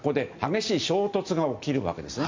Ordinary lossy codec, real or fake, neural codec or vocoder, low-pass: MP3, 64 kbps; real; none; 7.2 kHz